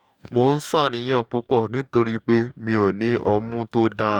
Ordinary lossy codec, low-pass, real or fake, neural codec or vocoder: none; 19.8 kHz; fake; codec, 44.1 kHz, 2.6 kbps, DAC